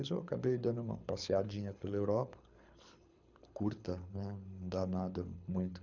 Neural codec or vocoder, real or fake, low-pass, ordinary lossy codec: codec, 24 kHz, 6 kbps, HILCodec; fake; 7.2 kHz; none